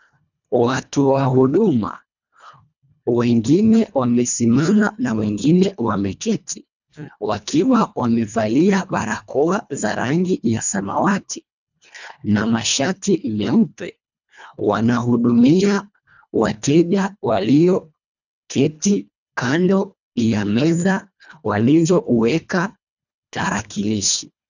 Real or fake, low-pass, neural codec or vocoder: fake; 7.2 kHz; codec, 24 kHz, 1.5 kbps, HILCodec